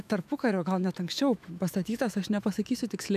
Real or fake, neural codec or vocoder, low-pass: fake; autoencoder, 48 kHz, 128 numbers a frame, DAC-VAE, trained on Japanese speech; 14.4 kHz